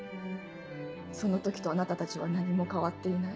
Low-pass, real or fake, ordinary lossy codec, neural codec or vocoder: none; real; none; none